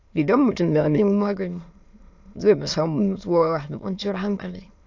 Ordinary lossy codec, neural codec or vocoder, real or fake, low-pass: AAC, 48 kbps; autoencoder, 22.05 kHz, a latent of 192 numbers a frame, VITS, trained on many speakers; fake; 7.2 kHz